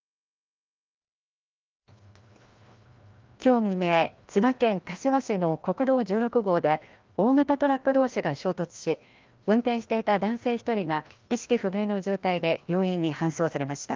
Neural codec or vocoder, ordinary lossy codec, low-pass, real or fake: codec, 16 kHz, 1 kbps, FreqCodec, larger model; Opus, 24 kbps; 7.2 kHz; fake